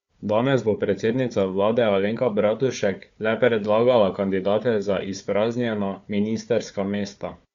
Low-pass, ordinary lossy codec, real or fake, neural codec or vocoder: 7.2 kHz; Opus, 64 kbps; fake; codec, 16 kHz, 4 kbps, FunCodec, trained on Chinese and English, 50 frames a second